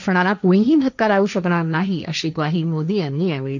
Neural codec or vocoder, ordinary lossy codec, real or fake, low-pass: codec, 16 kHz, 1.1 kbps, Voila-Tokenizer; none; fake; 7.2 kHz